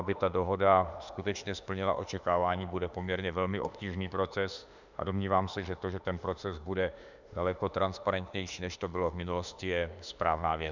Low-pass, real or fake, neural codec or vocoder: 7.2 kHz; fake; autoencoder, 48 kHz, 32 numbers a frame, DAC-VAE, trained on Japanese speech